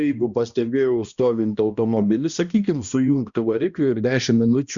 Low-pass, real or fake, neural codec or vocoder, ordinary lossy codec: 7.2 kHz; fake; codec, 16 kHz, 1 kbps, X-Codec, HuBERT features, trained on balanced general audio; Opus, 64 kbps